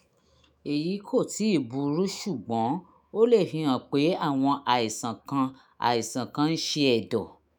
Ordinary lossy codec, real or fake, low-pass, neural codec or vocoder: none; fake; none; autoencoder, 48 kHz, 128 numbers a frame, DAC-VAE, trained on Japanese speech